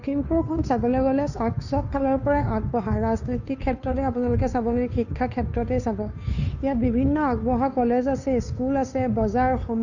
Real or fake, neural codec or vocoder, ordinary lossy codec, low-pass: fake; codec, 16 kHz, 2 kbps, FunCodec, trained on Chinese and English, 25 frames a second; none; 7.2 kHz